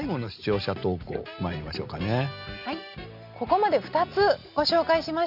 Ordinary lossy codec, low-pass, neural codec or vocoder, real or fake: none; 5.4 kHz; none; real